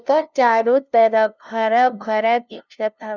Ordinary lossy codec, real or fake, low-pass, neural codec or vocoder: none; fake; 7.2 kHz; codec, 16 kHz, 0.5 kbps, FunCodec, trained on LibriTTS, 25 frames a second